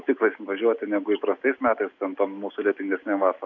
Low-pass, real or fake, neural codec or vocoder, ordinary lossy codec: 7.2 kHz; real; none; AAC, 48 kbps